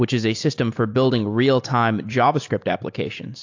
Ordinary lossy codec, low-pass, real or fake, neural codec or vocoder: AAC, 48 kbps; 7.2 kHz; real; none